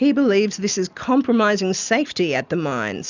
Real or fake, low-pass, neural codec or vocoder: real; 7.2 kHz; none